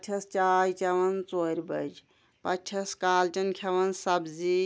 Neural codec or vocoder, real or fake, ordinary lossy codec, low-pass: none; real; none; none